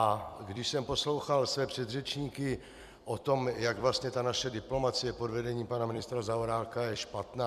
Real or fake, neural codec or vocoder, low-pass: fake; vocoder, 44.1 kHz, 128 mel bands every 256 samples, BigVGAN v2; 14.4 kHz